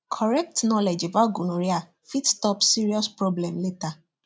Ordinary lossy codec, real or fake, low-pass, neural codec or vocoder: none; real; none; none